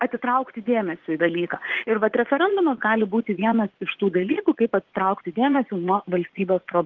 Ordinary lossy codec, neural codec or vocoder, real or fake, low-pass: Opus, 16 kbps; vocoder, 44.1 kHz, 80 mel bands, Vocos; fake; 7.2 kHz